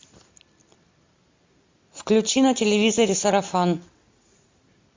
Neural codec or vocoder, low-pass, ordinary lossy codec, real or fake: none; 7.2 kHz; MP3, 48 kbps; real